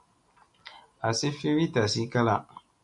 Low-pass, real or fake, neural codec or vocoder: 10.8 kHz; real; none